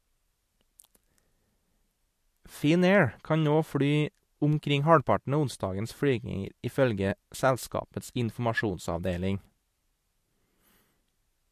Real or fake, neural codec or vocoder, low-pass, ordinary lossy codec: real; none; 14.4 kHz; MP3, 64 kbps